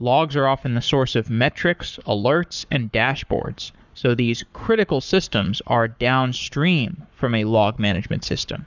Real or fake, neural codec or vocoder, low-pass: fake; codec, 44.1 kHz, 7.8 kbps, Pupu-Codec; 7.2 kHz